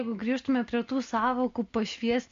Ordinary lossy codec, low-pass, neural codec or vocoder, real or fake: MP3, 48 kbps; 7.2 kHz; none; real